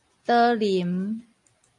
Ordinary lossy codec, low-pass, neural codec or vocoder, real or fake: AAC, 48 kbps; 10.8 kHz; none; real